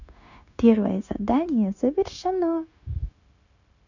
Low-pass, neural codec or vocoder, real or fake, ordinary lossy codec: 7.2 kHz; codec, 16 kHz, 0.9 kbps, LongCat-Audio-Codec; fake; none